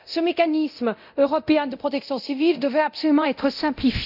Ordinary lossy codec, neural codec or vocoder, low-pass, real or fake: none; codec, 24 kHz, 0.9 kbps, DualCodec; 5.4 kHz; fake